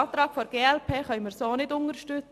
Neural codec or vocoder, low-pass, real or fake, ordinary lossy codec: none; 14.4 kHz; real; MP3, 96 kbps